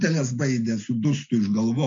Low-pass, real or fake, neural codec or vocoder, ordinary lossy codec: 7.2 kHz; real; none; MP3, 48 kbps